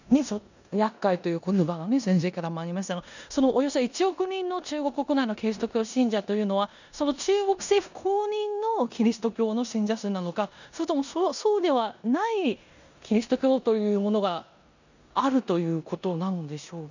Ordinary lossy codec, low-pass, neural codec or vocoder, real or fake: none; 7.2 kHz; codec, 16 kHz in and 24 kHz out, 0.9 kbps, LongCat-Audio-Codec, four codebook decoder; fake